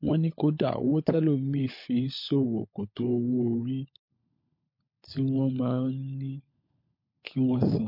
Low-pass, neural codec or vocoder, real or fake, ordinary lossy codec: 5.4 kHz; codec, 16 kHz, 16 kbps, FunCodec, trained on LibriTTS, 50 frames a second; fake; MP3, 32 kbps